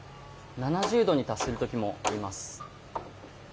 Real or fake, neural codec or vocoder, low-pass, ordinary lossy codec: real; none; none; none